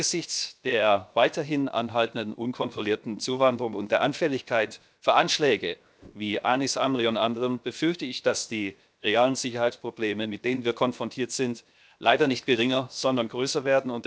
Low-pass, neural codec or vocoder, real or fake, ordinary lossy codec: none; codec, 16 kHz, 0.7 kbps, FocalCodec; fake; none